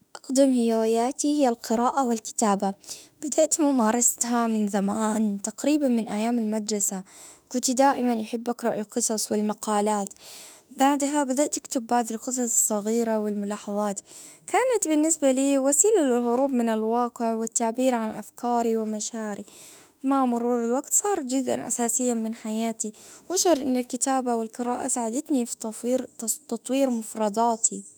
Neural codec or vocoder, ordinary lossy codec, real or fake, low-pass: autoencoder, 48 kHz, 32 numbers a frame, DAC-VAE, trained on Japanese speech; none; fake; none